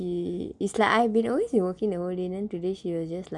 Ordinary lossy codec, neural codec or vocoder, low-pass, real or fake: none; none; 10.8 kHz; real